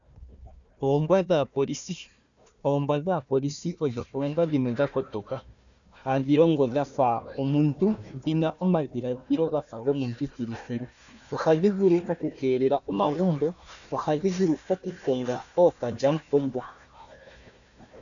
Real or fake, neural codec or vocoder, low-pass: fake; codec, 16 kHz, 1 kbps, FunCodec, trained on Chinese and English, 50 frames a second; 7.2 kHz